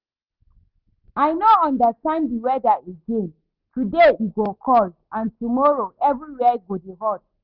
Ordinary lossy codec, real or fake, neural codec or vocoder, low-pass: Opus, 32 kbps; real; none; 5.4 kHz